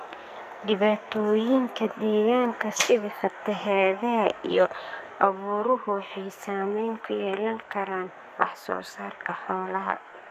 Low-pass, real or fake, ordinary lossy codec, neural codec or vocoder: 14.4 kHz; fake; none; codec, 44.1 kHz, 2.6 kbps, SNAC